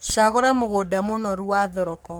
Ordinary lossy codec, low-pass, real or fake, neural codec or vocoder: none; none; fake; codec, 44.1 kHz, 3.4 kbps, Pupu-Codec